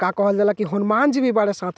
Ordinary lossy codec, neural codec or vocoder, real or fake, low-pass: none; none; real; none